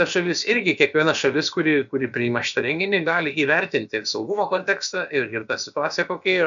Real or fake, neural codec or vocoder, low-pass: fake; codec, 16 kHz, about 1 kbps, DyCAST, with the encoder's durations; 7.2 kHz